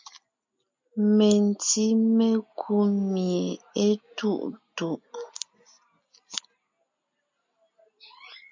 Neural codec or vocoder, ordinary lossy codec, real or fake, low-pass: none; MP3, 64 kbps; real; 7.2 kHz